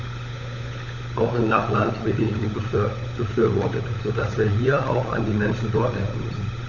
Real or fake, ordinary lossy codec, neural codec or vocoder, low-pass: fake; none; codec, 16 kHz, 16 kbps, FunCodec, trained on LibriTTS, 50 frames a second; 7.2 kHz